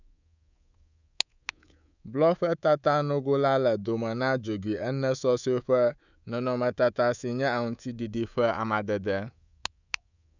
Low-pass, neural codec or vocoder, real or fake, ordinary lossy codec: 7.2 kHz; codec, 24 kHz, 3.1 kbps, DualCodec; fake; none